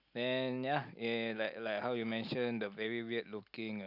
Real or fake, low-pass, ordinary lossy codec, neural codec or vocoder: real; 5.4 kHz; none; none